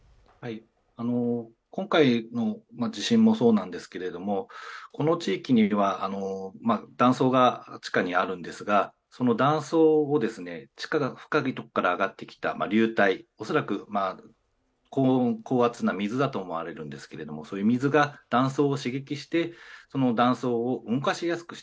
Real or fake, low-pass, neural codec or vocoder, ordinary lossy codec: real; none; none; none